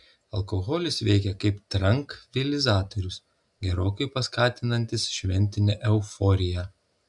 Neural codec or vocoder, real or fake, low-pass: none; real; 10.8 kHz